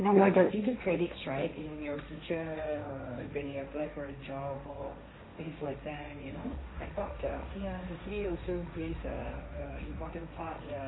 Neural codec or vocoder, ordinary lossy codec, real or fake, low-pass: codec, 16 kHz, 1.1 kbps, Voila-Tokenizer; AAC, 16 kbps; fake; 7.2 kHz